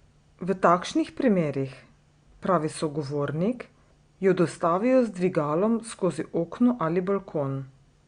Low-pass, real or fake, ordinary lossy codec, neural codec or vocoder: 9.9 kHz; real; Opus, 64 kbps; none